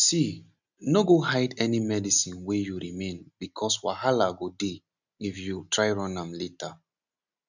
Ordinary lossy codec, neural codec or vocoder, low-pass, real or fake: none; none; 7.2 kHz; real